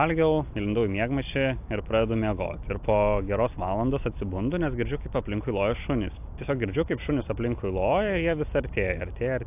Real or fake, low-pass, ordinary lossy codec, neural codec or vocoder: real; 3.6 kHz; AAC, 32 kbps; none